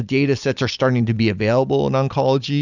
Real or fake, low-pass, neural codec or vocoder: real; 7.2 kHz; none